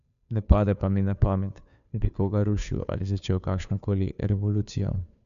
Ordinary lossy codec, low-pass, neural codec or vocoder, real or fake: none; 7.2 kHz; codec, 16 kHz, 2 kbps, FunCodec, trained on Chinese and English, 25 frames a second; fake